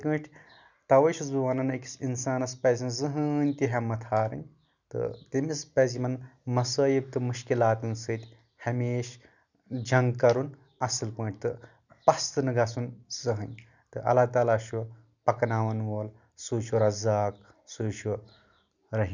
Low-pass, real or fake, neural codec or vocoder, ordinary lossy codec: 7.2 kHz; real; none; none